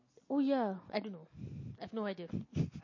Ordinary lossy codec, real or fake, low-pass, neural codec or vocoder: MP3, 32 kbps; real; 7.2 kHz; none